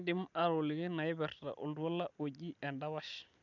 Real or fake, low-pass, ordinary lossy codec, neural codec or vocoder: real; 7.2 kHz; none; none